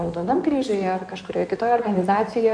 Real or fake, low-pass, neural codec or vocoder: fake; 9.9 kHz; codec, 16 kHz in and 24 kHz out, 2.2 kbps, FireRedTTS-2 codec